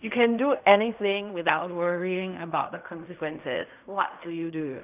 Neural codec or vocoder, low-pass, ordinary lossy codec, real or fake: codec, 16 kHz in and 24 kHz out, 0.4 kbps, LongCat-Audio-Codec, fine tuned four codebook decoder; 3.6 kHz; none; fake